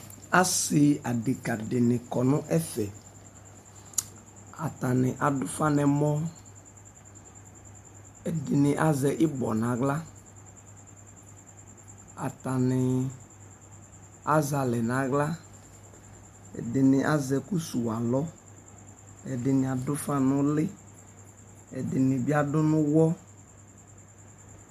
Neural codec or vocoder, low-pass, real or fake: none; 14.4 kHz; real